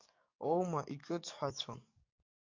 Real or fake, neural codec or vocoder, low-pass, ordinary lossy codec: fake; codec, 44.1 kHz, 7.8 kbps, DAC; 7.2 kHz; MP3, 64 kbps